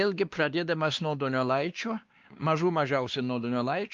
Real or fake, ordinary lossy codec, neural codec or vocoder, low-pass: fake; Opus, 32 kbps; codec, 16 kHz, 2 kbps, X-Codec, WavLM features, trained on Multilingual LibriSpeech; 7.2 kHz